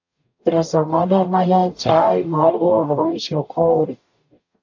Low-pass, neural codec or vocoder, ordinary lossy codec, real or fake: 7.2 kHz; codec, 44.1 kHz, 0.9 kbps, DAC; AAC, 48 kbps; fake